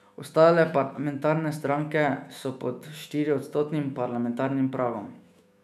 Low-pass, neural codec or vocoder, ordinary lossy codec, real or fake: 14.4 kHz; autoencoder, 48 kHz, 128 numbers a frame, DAC-VAE, trained on Japanese speech; none; fake